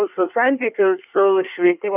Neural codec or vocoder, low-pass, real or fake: codec, 24 kHz, 1 kbps, SNAC; 3.6 kHz; fake